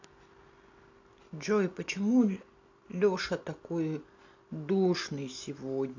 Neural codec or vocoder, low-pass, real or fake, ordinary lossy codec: none; 7.2 kHz; real; AAC, 48 kbps